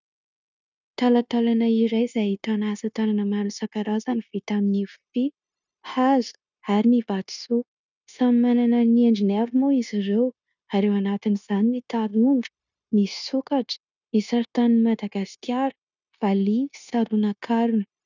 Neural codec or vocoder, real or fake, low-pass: codec, 16 kHz, 0.9 kbps, LongCat-Audio-Codec; fake; 7.2 kHz